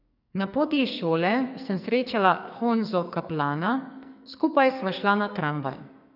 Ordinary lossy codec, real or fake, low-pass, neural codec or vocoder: none; fake; 5.4 kHz; codec, 44.1 kHz, 2.6 kbps, SNAC